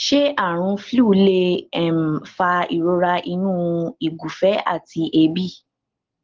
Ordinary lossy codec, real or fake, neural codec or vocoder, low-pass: Opus, 16 kbps; real; none; 7.2 kHz